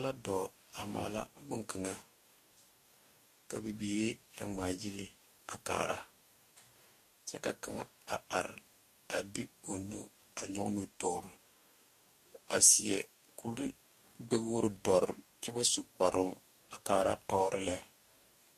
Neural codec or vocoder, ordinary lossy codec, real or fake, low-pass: codec, 44.1 kHz, 2.6 kbps, DAC; MP3, 64 kbps; fake; 14.4 kHz